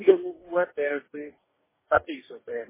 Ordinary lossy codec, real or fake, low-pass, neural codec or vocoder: MP3, 16 kbps; fake; 3.6 kHz; codec, 44.1 kHz, 2.6 kbps, DAC